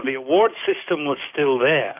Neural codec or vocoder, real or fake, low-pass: codec, 44.1 kHz, 7.8 kbps, DAC; fake; 3.6 kHz